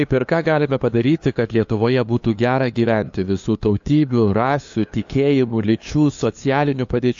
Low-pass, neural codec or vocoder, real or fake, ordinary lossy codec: 7.2 kHz; codec, 16 kHz, 2 kbps, FunCodec, trained on LibriTTS, 25 frames a second; fake; AAC, 64 kbps